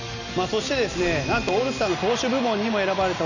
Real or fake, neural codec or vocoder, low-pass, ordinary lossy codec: real; none; 7.2 kHz; none